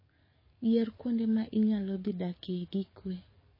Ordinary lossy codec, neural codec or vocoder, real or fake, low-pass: MP3, 24 kbps; codec, 44.1 kHz, 7.8 kbps, DAC; fake; 5.4 kHz